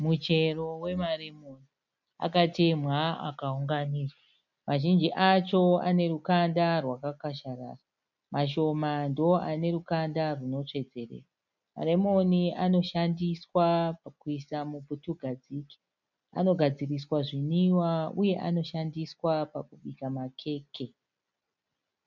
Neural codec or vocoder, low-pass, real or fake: none; 7.2 kHz; real